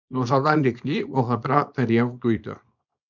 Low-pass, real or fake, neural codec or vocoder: 7.2 kHz; fake; codec, 24 kHz, 0.9 kbps, WavTokenizer, small release